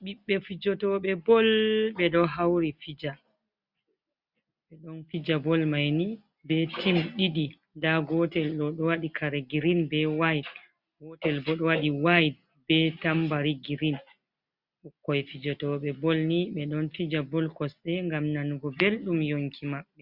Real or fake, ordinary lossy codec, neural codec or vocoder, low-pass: real; Opus, 64 kbps; none; 5.4 kHz